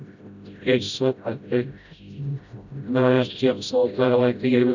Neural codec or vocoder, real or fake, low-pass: codec, 16 kHz, 0.5 kbps, FreqCodec, smaller model; fake; 7.2 kHz